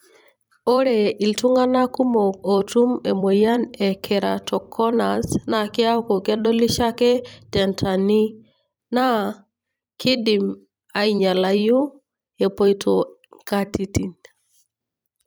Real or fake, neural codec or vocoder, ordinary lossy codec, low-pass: fake; vocoder, 44.1 kHz, 128 mel bands every 256 samples, BigVGAN v2; none; none